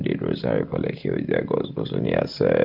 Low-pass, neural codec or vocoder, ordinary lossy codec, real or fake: 5.4 kHz; none; Opus, 32 kbps; real